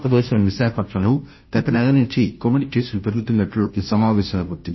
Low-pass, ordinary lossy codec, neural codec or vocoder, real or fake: 7.2 kHz; MP3, 24 kbps; codec, 16 kHz, 0.5 kbps, FunCodec, trained on Chinese and English, 25 frames a second; fake